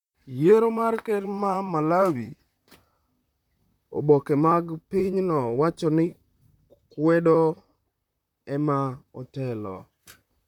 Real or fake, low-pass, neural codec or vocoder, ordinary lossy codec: fake; 19.8 kHz; vocoder, 44.1 kHz, 128 mel bands, Pupu-Vocoder; none